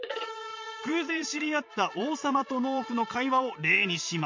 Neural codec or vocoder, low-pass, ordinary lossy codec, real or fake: vocoder, 22.05 kHz, 80 mel bands, Vocos; 7.2 kHz; MP3, 48 kbps; fake